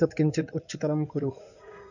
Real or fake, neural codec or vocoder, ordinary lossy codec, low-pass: fake; codec, 16 kHz in and 24 kHz out, 2.2 kbps, FireRedTTS-2 codec; none; 7.2 kHz